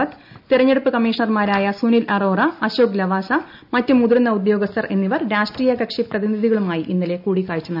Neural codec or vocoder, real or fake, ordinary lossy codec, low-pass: none; real; none; 5.4 kHz